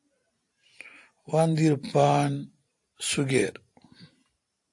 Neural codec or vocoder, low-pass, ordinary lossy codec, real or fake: vocoder, 44.1 kHz, 128 mel bands every 512 samples, BigVGAN v2; 10.8 kHz; AAC, 64 kbps; fake